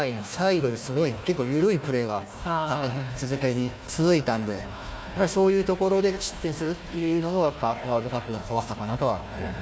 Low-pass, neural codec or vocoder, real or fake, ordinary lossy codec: none; codec, 16 kHz, 1 kbps, FunCodec, trained on Chinese and English, 50 frames a second; fake; none